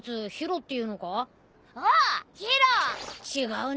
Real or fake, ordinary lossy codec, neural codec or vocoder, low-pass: real; none; none; none